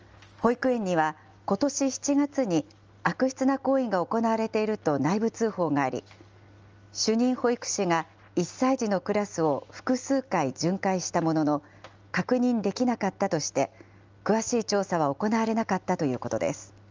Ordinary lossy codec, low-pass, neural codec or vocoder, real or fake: Opus, 24 kbps; 7.2 kHz; none; real